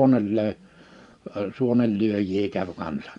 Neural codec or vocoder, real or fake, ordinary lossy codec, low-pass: vocoder, 24 kHz, 100 mel bands, Vocos; fake; none; 10.8 kHz